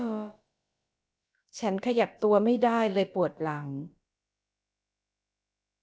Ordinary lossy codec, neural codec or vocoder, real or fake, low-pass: none; codec, 16 kHz, about 1 kbps, DyCAST, with the encoder's durations; fake; none